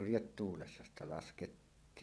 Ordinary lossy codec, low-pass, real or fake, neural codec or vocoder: none; none; fake; vocoder, 22.05 kHz, 80 mel bands, WaveNeXt